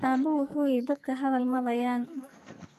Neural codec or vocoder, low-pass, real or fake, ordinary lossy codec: codec, 44.1 kHz, 2.6 kbps, SNAC; 14.4 kHz; fake; none